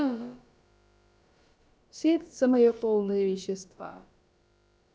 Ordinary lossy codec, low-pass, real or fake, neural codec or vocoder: none; none; fake; codec, 16 kHz, about 1 kbps, DyCAST, with the encoder's durations